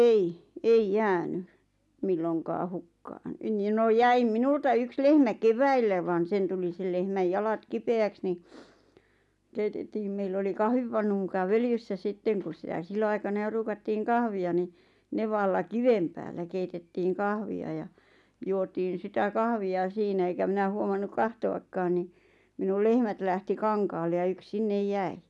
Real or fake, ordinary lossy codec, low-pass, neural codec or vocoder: real; none; none; none